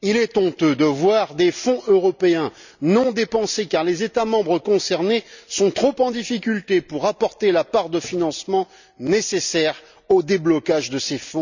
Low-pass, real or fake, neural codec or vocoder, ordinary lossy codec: 7.2 kHz; real; none; none